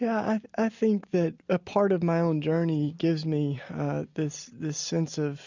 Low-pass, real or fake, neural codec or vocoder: 7.2 kHz; real; none